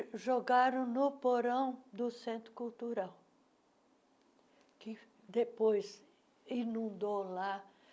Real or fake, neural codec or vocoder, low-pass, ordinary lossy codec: real; none; none; none